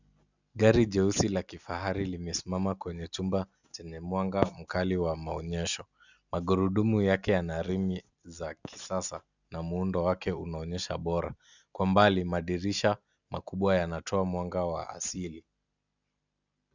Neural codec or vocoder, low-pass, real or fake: none; 7.2 kHz; real